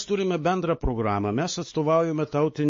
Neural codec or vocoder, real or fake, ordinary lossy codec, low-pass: codec, 16 kHz, 4 kbps, X-Codec, WavLM features, trained on Multilingual LibriSpeech; fake; MP3, 32 kbps; 7.2 kHz